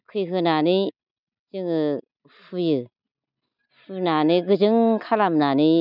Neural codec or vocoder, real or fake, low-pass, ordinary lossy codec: none; real; 5.4 kHz; none